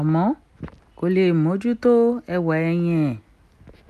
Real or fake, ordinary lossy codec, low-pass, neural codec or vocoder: real; none; 14.4 kHz; none